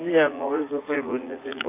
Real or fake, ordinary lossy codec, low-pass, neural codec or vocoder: fake; AAC, 24 kbps; 3.6 kHz; vocoder, 44.1 kHz, 80 mel bands, Vocos